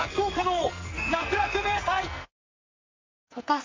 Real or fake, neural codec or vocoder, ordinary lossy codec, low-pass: fake; codec, 44.1 kHz, 2.6 kbps, SNAC; MP3, 48 kbps; 7.2 kHz